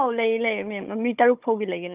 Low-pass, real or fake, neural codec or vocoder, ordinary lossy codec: 3.6 kHz; fake; codec, 16 kHz, 16 kbps, FunCodec, trained on Chinese and English, 50 frames a second; Opus, 24 kbps